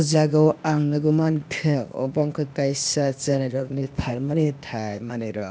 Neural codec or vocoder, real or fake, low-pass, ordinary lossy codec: codec, 16 kHz, 0.8 kbps, ZipCodec; fake; none; none